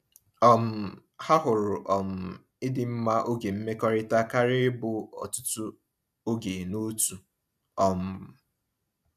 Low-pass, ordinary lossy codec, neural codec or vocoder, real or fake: 14.4 kHz; none; none; real